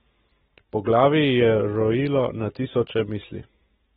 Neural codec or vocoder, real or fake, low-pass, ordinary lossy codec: none; real; 19.8 kHz; AAC, 16 kbps